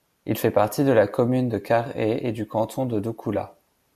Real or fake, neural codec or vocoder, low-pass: real; none; 14.4 kHz